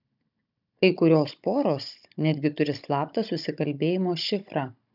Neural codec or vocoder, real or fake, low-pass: codec, 16 kHz, 16 kbps, FunCodec, trained on Chinese and English, 50 frames a second; fake; 5.4 kHz